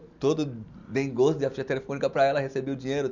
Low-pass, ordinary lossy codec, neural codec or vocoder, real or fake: 7.2 kHz; none; none; real